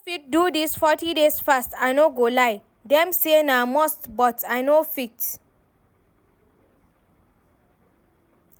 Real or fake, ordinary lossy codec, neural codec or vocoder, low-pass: real; none; none; none